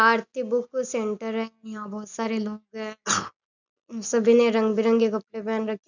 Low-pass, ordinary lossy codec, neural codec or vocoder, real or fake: 7.2 kHz; none; none; real